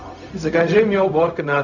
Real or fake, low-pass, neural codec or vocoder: fake; 7.2 kHz; codec, 16 kHz, 0.4 kbps, LongCat-Audio-Codec